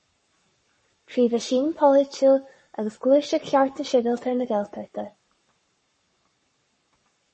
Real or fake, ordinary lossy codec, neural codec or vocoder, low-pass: fake; MP3, 32 kbps; codec, 44.1 kHz, 7.8 kbps, Pupu-Codec; 10.8 kHz